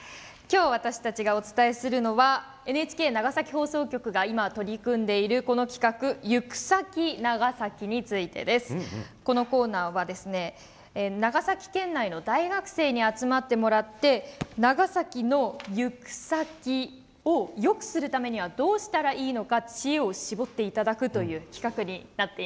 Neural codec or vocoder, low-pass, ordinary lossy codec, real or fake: none; none; none; real